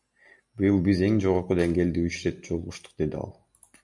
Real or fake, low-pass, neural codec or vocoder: real; 10.8 kHz; none